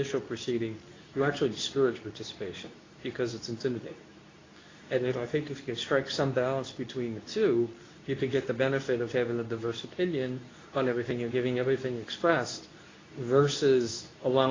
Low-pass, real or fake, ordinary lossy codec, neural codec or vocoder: 7.2 kHz; fake; AAC, 32 kbps; codec, 24 kHz, 0.9 kbps, WavTokenizer, medium speech release version 2